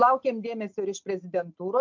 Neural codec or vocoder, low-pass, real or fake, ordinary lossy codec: none; 7.2 kHz; real; MP3, 64 kbps